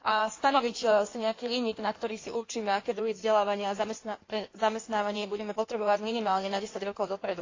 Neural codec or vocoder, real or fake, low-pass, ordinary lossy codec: codec, 16 kHz in and 24 kHz out, 1.1 kbps, FireRedTTS-2 codec; fake; 7.2 kHz; AAC, 32 kbps